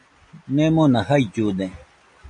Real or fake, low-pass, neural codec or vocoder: real; 9.9 kHz; none